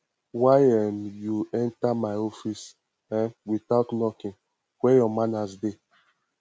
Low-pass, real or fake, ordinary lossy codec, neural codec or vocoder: none; real; none; none